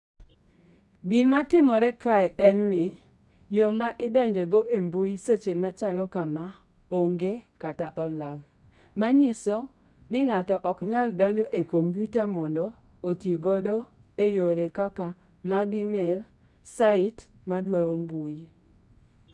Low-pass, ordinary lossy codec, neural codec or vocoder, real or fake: none; none; codec, 24 kHz, 0.9 kbps, WavTokenizer, medium music audio release; fake